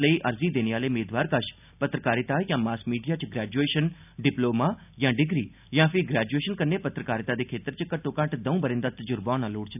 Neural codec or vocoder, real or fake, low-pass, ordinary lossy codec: none; real; 3.6 kHz; none